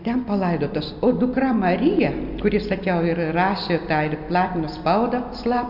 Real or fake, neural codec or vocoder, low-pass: real; none; 5.4 kHz